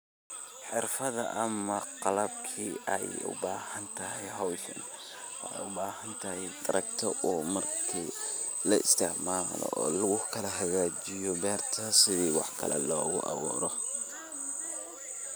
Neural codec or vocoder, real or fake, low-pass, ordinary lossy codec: none; real; none; none